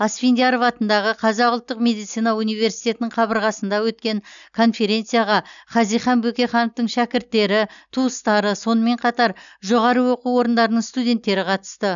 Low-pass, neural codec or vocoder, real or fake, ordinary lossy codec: 7.2 kHz; none; real; none